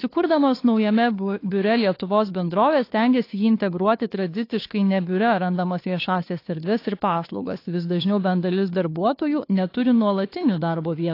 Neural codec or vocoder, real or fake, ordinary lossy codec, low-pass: codec, 16 kHz, 2 kbps, FunCodec, trained on Chinese and English, 25 frames a second; fake; AAC, 32 kbps; 5.4 kHz